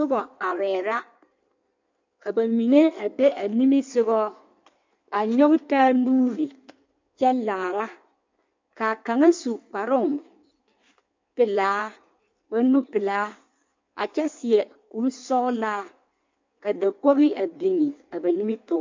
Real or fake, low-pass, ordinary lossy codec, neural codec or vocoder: fake; 7.2 kHz; MP3, 64 kbps; codec, 16 kHz in and 24 kHz out, 1.1 kbps, FireRedTTS-2 codec